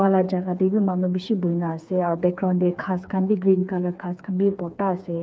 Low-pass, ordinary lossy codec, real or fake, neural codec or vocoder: none; none; fake; codec, 16 kHz, 4 kbps, FreqCodec, smaller model